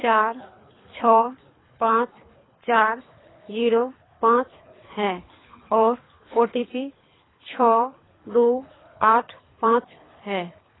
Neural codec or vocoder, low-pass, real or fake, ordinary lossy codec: codec, 24 kHz, 3 kbps, HILCodec; 7.2 kHz; fake; AAC, 16 kbps